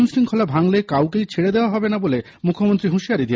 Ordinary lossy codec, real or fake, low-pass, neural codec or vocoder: none; real; none; none